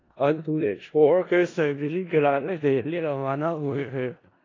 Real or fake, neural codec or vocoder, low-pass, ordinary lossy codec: fake; codec, 16 kHz in and 24 kHz out, 0.4 kbps, LongCat-Audio-Codec, four codebook decoder; 7.2 kHz; AAC, 32 kbps